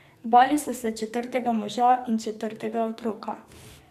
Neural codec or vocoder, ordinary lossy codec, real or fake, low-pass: codec, 44.1 kHz, 2.6 kbps, SNAC; none; fake; 14.4 kHz